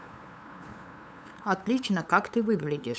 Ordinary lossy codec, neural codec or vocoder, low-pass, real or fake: none; codec, 16 kHz, 8 kbps, FunCodec, trained on LibriTTS, 25 frames a second; none; fake